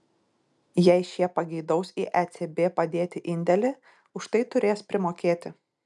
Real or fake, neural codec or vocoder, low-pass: real; none; 10.8 kHz